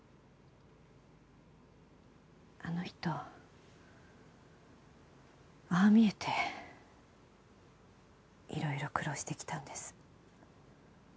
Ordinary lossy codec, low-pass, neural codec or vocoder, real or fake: none; none; none; real